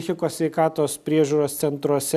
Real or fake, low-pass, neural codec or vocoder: real; 14.4 kHz; none